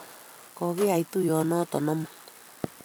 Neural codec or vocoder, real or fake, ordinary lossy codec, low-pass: vocoder, 44.1 kHz, 128 mel bands every 256 samples, BigVGAN v2; fake; none; none